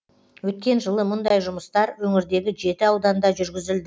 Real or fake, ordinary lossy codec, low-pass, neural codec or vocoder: real; none; none; none